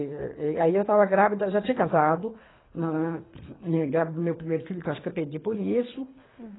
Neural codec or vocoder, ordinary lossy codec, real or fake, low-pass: codec, 24 kHz, 3 kbps, HILCodec; AAC, 16 kbps; fake; 7.2 kHz